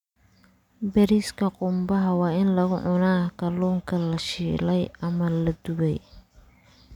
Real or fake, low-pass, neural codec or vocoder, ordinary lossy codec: real; 19.8 kHz; none; none